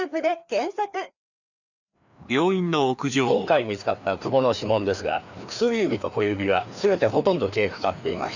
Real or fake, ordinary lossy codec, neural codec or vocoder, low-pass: fake; none; codec, 16 kHz, 2 kbps, FreqCodec, larger model; 7.2 kHz